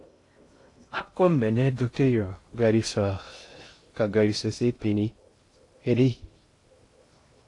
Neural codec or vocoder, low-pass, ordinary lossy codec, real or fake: codec, 16 kHz in and 24 kHz out, 0.6 kbps, FocalCodec, streaming, 2048 codes; 10.8 kHz; AAC, 48 kbps; fake